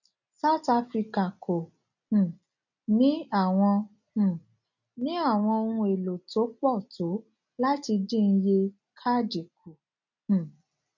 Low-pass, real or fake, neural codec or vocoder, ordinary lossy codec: 7.2 kHz; real; none; none